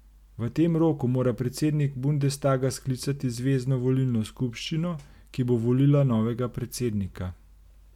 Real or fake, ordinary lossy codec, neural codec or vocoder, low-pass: real; MP3, 96 kbps; none; 19.8 kHz